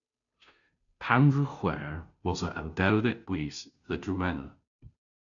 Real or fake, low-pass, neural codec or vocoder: fake; 7.2 kHz; codec, 16 kHz, 0.5 kbps, FunCodec, trained on Chinese and English, 25 frames a second